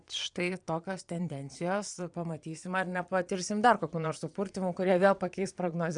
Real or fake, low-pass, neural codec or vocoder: fake; 9.9 kHz; vocoder, 22.05 kHz, 80 mel bands, Vocos